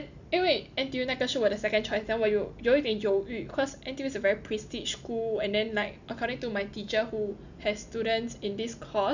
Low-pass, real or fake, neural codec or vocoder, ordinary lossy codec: 7.2 kHz; real; none; none